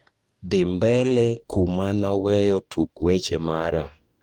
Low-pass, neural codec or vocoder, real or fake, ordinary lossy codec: 19.8 kHz; codec, 44.1 kHz, 2.6 kbps, DAC; fake; Opus, 32 kbps